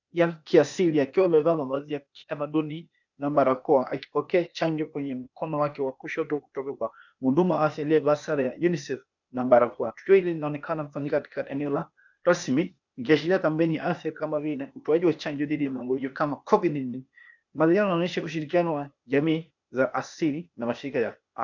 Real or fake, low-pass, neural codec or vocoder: fake; 7.2 kHz; codec, 16 kHz, 0.8 kbps, ZipCodec